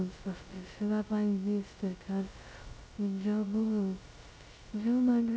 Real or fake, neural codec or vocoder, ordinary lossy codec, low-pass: fake; codec, 16 kHz, 0.2 kbps, FocalCodec; none; none